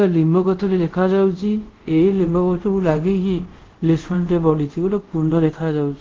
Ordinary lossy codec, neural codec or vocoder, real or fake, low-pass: Opus, 16 kbps; codec, 24 kHz, 0.5 kbps, DualCodec; fake; 7.2 kHz